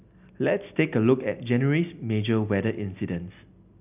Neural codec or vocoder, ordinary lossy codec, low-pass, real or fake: none; none; 3.6 kHz; real